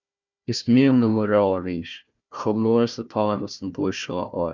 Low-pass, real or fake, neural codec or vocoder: 7.2 kHz; fake; codec, 16 kHz, 1 kbps, FunCodec, trained on Chinese and English, 50 frames a second